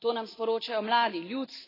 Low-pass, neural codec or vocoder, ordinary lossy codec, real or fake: 5.4 kHz; none; AAC, 24 kbps; real